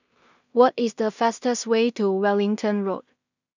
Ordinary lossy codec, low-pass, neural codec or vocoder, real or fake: none; 7.2 kHz; codec, 16 kHz in and 24 kHz out, 0.4 kbps, LongCat-Audio-Codec, two codebook decoder; fake